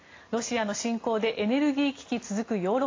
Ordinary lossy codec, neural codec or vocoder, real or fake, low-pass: AAC, 32 kbps; none; real; 7.2 kHz